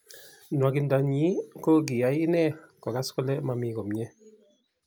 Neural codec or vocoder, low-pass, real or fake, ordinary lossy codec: none; none; real; none